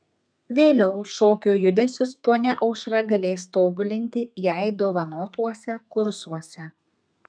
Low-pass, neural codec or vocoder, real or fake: 9.9 kHz; codec, 32 kHz, 1.9 kbps, SNAC; fake